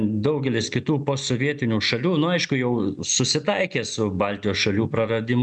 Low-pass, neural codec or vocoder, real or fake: 10.8 kHz; none; real